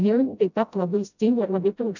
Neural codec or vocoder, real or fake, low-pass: codec, 16 kHz, 0.5 kbps, FreqCodec, smaller model; fake; 7.2 kHz